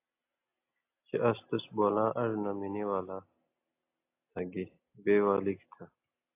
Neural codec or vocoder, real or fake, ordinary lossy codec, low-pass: none; real; AAC, 24 kbps; 3.6 kHz